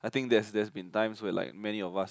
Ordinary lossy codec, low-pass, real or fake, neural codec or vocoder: none; none; real; none